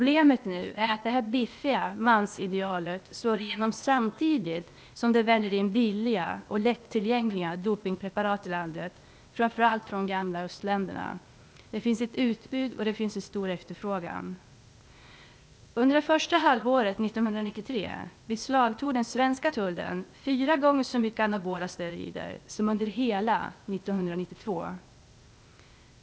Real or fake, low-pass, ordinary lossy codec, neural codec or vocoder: fake; none; none; codec, 16 kHz, 0.8 kbps, ZipCodec